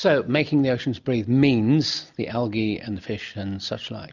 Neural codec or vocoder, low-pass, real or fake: none; 7.2 kHz; real